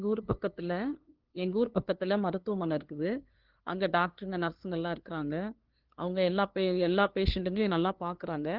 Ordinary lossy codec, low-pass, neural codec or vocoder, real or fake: Opus, 16 kbps; 5.4 kHz; codec, 16 kHz, 2 kbps, FunCodec, trained on LibriTTS, 25 frames a second; fake